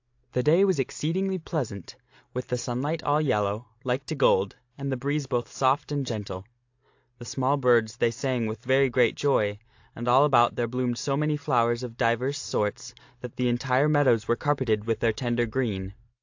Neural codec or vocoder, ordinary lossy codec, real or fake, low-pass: none; AAC, 48 kbps; real; 7.2 kHz